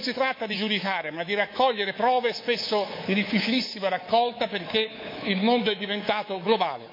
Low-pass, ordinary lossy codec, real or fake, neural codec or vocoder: 5.4 kHz; MP3, 32 kbps; fake; codec, 24 kHz, 3.1 kbps, DualCodec